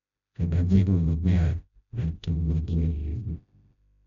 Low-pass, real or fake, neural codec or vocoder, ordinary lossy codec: 7.2 kHz; fake; codec, 16 kHz, 0.5 kbps, FreqCodec, smaller model; none